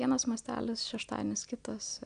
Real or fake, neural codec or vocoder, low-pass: real; none; 9.9 kHz